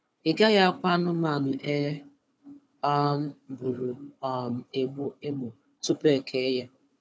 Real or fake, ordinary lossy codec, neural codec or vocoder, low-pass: fake; none; codec, 16 kHz, 4 kbps, FunCodec, trained on Chinese and English, 50 frames a second; none